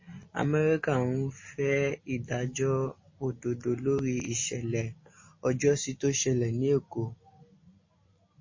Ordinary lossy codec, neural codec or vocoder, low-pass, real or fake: MP3, 32 kbps; none; 7.2 kHz; real